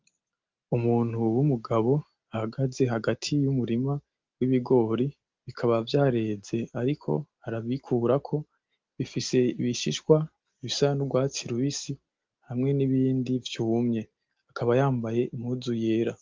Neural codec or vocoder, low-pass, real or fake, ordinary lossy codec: none; 7.2 kHz; real; Opus, 32 kbps